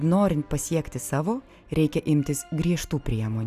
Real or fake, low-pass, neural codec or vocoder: real; 14.4 kHz; none